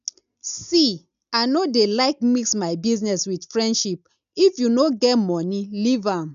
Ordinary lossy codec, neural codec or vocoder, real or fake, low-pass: none; none; real; 7.2 kHz